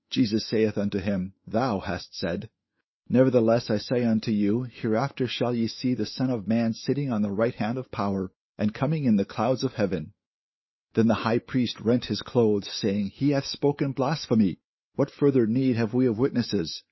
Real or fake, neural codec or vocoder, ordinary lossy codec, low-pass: fake; autoencoder, 48 kHz, 128 numbers a frame, DAC-VAE, trained on Japanese speech; MP3, 24 kbps; 7.2 kHz